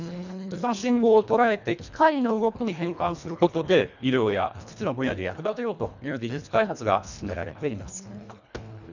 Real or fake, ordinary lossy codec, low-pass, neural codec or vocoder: fake; none; 7.2 kHz; codec, 24 kHz, 1.5 kbps, HILCodec